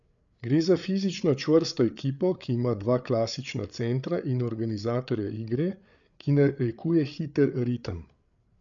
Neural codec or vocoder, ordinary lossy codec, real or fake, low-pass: codec, 16 kHz, 8 kbps, FreqCodec, larger model; none; fake; 7.2 kHz